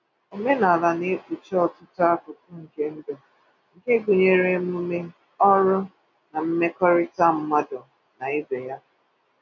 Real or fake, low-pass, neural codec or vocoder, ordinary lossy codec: real; 7.2 kHz; none; none